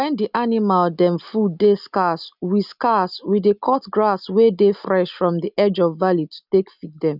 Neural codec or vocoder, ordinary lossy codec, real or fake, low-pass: none; none; real; 5.4 kHz